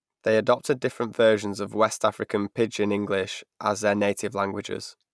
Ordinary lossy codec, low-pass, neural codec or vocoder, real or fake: none; none; none; real